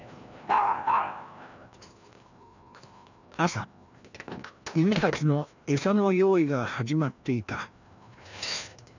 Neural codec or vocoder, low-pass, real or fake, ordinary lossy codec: codec, 16 kHz, 1 kbps, FreqCodec, larger model; 7.2 kHz; fake; none